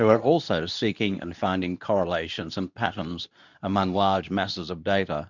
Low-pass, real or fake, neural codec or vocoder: 7.2 kHz; fake; codec, 24 kHz, 0.9 kbps, WavTokenizer, medium speech release version 2